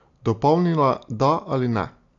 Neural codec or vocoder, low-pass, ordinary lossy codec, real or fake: none; 7.2 kHz; AAC, 48 kbps; real